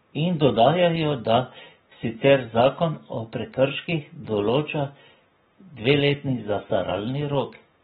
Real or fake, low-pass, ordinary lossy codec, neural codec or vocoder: real; 10.8 kHz; AAC, 16 kbps; none